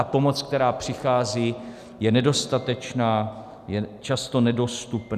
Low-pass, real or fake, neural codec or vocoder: 14.4 kHz; real; none